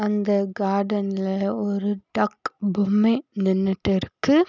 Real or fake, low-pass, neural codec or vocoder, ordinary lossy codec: real; 7.2 kHz; none; none